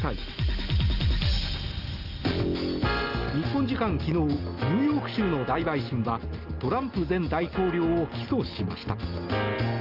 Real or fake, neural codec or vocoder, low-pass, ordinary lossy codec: real; none; 5.4 kHz; Opus, 24 kbps